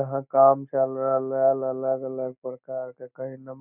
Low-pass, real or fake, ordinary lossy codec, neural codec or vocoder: 3.6 kHz; real; none; none